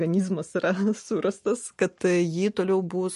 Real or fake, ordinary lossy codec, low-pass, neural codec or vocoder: real; MP3, 48 kbps; 14.4 kHz; none